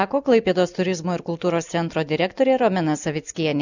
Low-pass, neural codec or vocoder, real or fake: 7.2 kHz; none; real